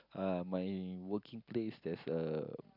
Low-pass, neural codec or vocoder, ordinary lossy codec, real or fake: 5.4 kHz; none; none; real